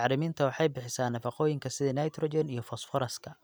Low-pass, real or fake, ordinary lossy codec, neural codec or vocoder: none; real; none; none